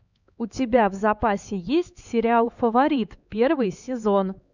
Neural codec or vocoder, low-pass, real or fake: codec, 16 kHz, 4 kbps, X-Codec, HuBERT features, trained on LibriSpeech; 7.2 kHz; fake